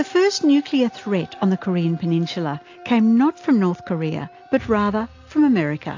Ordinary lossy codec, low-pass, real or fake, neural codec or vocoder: AAC, 48 kbps; 7.2 kHz; real; none